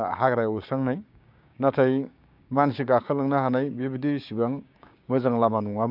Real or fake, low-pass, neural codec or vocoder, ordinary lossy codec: fake; 5.4 kHz; codec, 16 kHz, 6 kbps, DAC; none